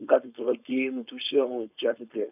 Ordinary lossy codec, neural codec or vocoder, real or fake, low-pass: none; codec, 16 kHz, 4.8 kbps, FACodec; fake; 3.6 kHz